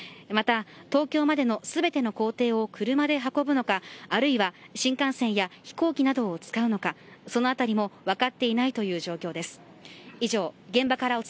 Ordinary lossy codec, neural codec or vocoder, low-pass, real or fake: none; none; none; real